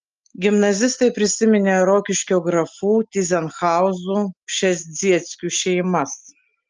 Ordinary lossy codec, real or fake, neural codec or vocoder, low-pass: Opus, 24 kbps; real; none; 7.2 kHz